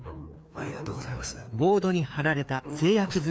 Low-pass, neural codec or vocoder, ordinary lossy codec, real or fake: none; codec, 16 kHz, 2 kbps, FreqCodec, larger model; none; fake